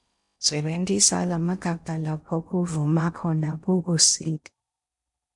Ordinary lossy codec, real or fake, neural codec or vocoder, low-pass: MP3, 96 kbps; fake; codec, 16 kHz in and 24 kHz out, 0.6 kbps, FocalCodec, streaming, 4096 codes; 10.8 kHz